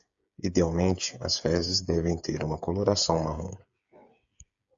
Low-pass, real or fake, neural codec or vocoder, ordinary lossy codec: 7.2 kHz; fake; codec, 16 kHz, 8 kbps, FreqCodec, smaller model; AAC, 64 kbps